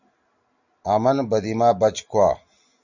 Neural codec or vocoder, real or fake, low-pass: none; real; 7.2 kHz